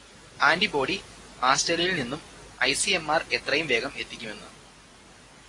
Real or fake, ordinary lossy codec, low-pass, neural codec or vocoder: real; AAC, 32 kbps; 10.8 kHz; none